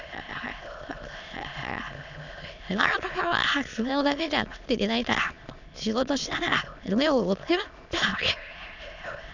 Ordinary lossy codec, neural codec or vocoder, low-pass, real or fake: none; autoencoder, 22.05 kHz, a latent of 192 numbers a frame, VITS, trained on many speakers; 7.2 kHz; fake